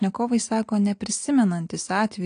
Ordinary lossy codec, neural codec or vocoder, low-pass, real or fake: AAC, 48 kbps; none; 9.9 kHz; real